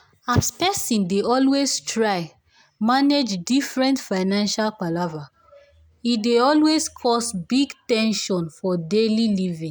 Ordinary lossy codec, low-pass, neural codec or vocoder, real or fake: none; none; none; real